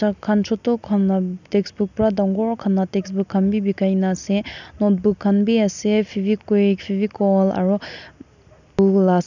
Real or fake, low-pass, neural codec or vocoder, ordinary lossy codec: real; 7.2 kHz; none; none